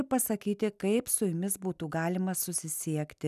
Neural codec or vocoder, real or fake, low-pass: none; real; 14.4 kHz